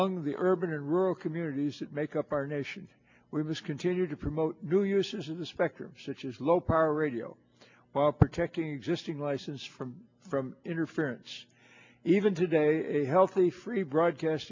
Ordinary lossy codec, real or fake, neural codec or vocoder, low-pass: MP3, 64 kbps; real; none; 7.2 kHz